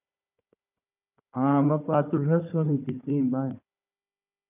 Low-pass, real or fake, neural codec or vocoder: 3.6 kHz; fake; codec, 16 kHz, 4 kbps, FunCodec, trained on Chinese and English, 50 frames a second